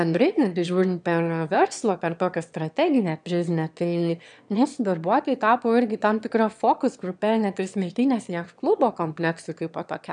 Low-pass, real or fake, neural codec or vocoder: 9.9 kHz; fake; autoencoder, 22.05 kHz, a latent of 192 numbers a frame, VITS, trained on one speaker